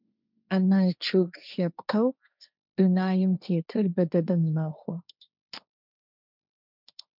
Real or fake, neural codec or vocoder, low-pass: fake; codec, 16 kHz, 1.1 kbps, Voila-Tokenizer; 5.4 kHz